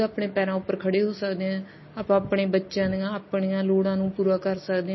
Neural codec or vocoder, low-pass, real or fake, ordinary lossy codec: none; 7.2 kHz; real; MP3, 24 kbps